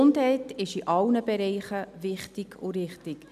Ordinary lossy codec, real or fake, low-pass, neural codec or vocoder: none; real; 14.4 kHz; none